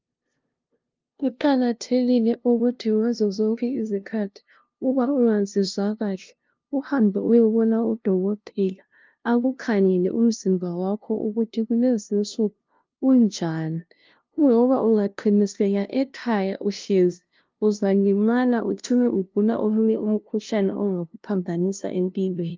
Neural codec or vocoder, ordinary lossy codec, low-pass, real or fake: codec, 16 kHz, 0.5 kbps, FunCodec, trained on LibriTTS, 25 frames a second; Opus, 24 kbps; 7.2 kHz; fake